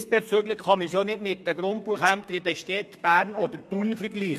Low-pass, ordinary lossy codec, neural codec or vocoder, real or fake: 14.4 kHz; MP3, 64 kbps; codec, 32 kHz, 1.9 kbps, SNAC; fake